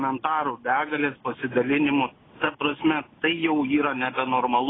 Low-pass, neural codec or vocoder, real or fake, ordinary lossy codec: 7.2 kHz; none; real; AAC, 16 kbps